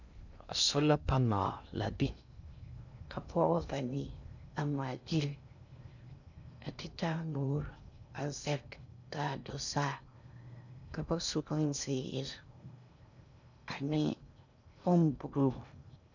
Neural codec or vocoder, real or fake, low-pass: codec, 16 kHz in and 24 kHz out, 0.6 kbps, FocalCodec, streaming, 2048 codes; fake; 7.2 kHz